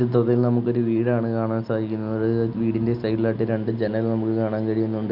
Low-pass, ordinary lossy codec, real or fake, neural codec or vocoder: 5.4 kHz; none; real; none